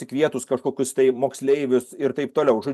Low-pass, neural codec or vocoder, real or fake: 14.4 kHz; vocoder, 44.1 kHz, 128 mel bands every 256 samples, BigVGAN v2; fake